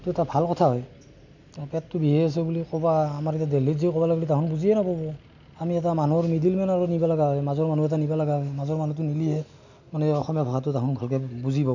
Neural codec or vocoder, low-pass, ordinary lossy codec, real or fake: none; 7.2 kHz; none; real